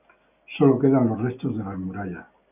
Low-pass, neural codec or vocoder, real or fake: 3.6 kHz; none; real